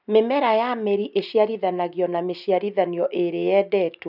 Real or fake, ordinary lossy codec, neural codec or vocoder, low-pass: real; none; none; 5.4 kHz